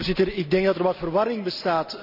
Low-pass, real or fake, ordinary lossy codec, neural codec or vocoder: 5.4 kHz; real; none; none